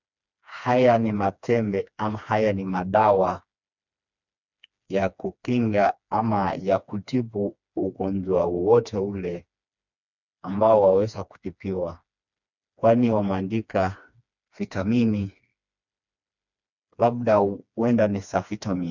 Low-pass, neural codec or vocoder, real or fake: 7.2 kHz; codec, 16 kHz, 2 kbps, FreqCodec, smaller model; fake